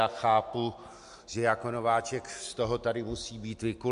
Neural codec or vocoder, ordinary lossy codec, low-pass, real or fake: none; MP3, 64 kbps; 10.8 kHz; real